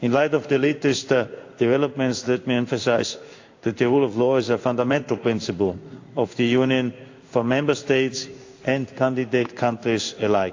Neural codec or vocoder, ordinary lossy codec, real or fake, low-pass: codec, 16 kHz in and 24 kHz out, 1 kbps, XY-Tokenizer; AAC, 48 kbps; fake; 7.2 kHz